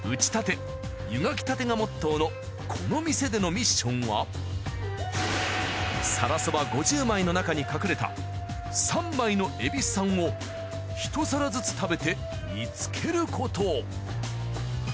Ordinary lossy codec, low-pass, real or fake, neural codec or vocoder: none; none; real; none